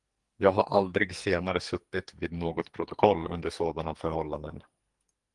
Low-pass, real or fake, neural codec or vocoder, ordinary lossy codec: 10.8 kHz; fake; codec, 44.1 kHz, 2.6 kbps, SNAC; Opus, 24 kbps